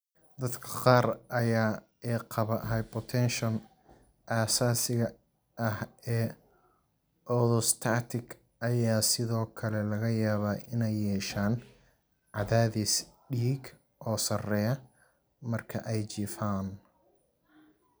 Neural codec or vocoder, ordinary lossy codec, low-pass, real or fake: none; none; none; real